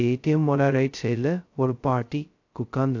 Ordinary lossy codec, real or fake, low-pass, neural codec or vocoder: AAC, 48 kbps; fake; 7.2 kHz; codec, 16 kHz, 0.2 kbps, FocalCodec